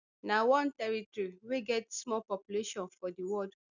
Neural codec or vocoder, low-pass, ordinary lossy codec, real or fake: none; 7.2 kHz; none; real